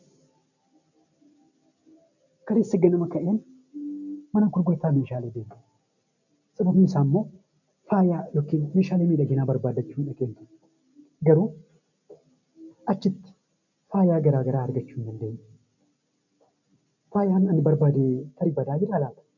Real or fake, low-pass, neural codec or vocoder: real; 7.2 kHz; none